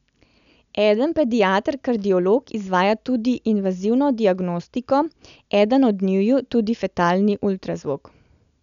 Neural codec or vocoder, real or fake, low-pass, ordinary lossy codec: none; real; 7.2 kHz; none